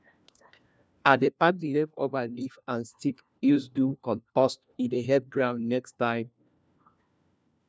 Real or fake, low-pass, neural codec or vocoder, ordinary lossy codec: fake; none; codec, 16 kHz, 1 kbps, FunCodec, trained on LibriTTS, 50 frames a second; none